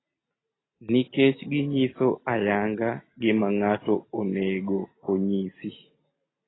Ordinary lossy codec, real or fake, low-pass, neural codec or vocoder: AAC, 16 kbps; real; 7.2 kHz; none